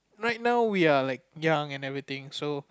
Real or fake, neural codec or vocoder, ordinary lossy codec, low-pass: real; none; none; none